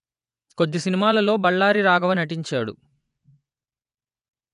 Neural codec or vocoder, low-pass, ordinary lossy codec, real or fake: vocoder, 24 kHz, 100 mel bands, Vocos; 10.8 kHz; none; fake